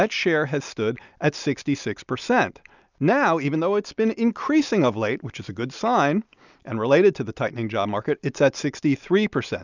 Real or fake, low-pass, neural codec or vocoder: real; 7.2 kHz; none